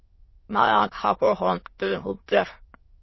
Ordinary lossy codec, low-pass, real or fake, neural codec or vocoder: MP3, 24 kbps; 7.2 kHz; fake; autoencoder, 22.05 kHz, a latent of 192 numbers a frame, VITS, trained on many speakers